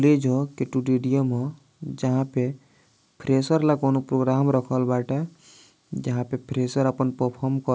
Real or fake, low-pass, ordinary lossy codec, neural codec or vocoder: real; none; none; none